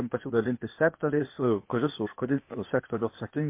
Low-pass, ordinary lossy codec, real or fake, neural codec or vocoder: 3.6 kHz; MP3, 24 kbps; fake; codec, 16 kHz, 0.8 kbps, ZipCodec